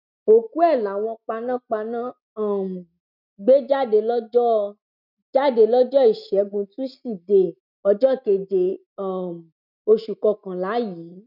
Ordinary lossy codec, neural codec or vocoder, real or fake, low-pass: none; none; real; 5.4 kHz